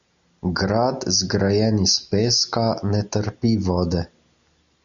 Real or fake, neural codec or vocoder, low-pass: real; none; 7.2 kHz